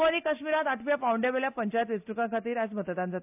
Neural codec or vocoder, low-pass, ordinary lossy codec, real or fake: none; 3.6 kHz; AAC, 32 kbps; real